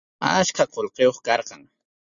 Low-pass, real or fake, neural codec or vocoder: 7.2 kHz; real; none